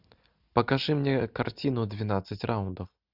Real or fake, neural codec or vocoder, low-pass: real; none; 5.4 kHz